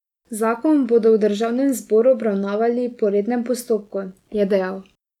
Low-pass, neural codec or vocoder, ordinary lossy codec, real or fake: 19.8 kHz; none; none; real